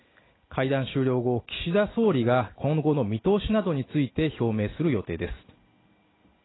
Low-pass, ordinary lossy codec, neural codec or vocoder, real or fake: 7.2 kHz; AAC, 16 kbps; none; real